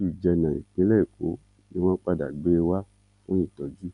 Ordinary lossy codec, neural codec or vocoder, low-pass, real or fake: none; none; 10.8 kHz; real